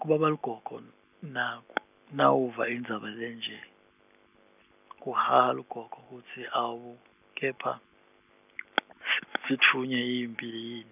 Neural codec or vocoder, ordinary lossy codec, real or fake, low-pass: none; none; real; 3.6 kHz